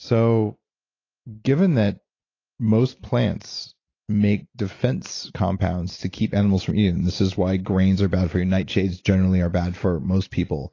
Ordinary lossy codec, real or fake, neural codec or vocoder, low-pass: AAC, 32 kbps; real; none; 7.2 kHz